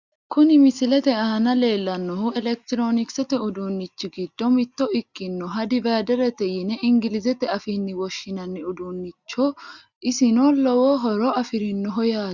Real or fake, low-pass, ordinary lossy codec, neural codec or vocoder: real; 7.2 kHz; Opus, 64 kbps; none